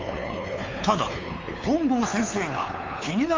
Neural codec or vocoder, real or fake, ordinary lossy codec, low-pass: codec, 16 kHz, 4 kbps, X-Codec, WavLM features, trained on Multilingual LibriSpeech; fake; Opus, 32 kbps; 7.2 kHz